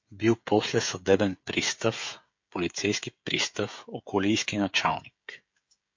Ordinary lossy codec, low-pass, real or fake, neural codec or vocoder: MP3, 48 kbps; 7.2 kHz; fake; codec, 16 kHz, 16 kbps, FreqCodec, smaller model